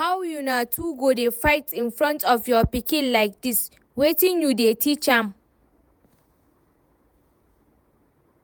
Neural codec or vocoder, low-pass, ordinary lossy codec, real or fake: vocoder, 48 kHz, 128 mel bands, Vocos; none; none; fake